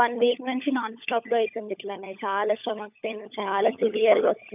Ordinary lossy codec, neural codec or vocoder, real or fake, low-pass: none; codec, 16 kHz, 16 kbps, FunCodec, trained on LibriTTS, 50 frames a second; fake; 3.6 kHz